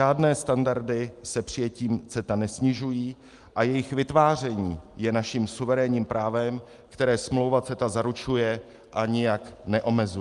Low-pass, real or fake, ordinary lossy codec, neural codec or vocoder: 10.8 kHz; real; Opus, 32 kbps; none